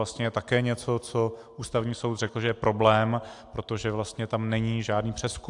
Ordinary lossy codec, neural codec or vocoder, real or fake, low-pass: AAC, 64 kbps; none; real; 10.8 kHz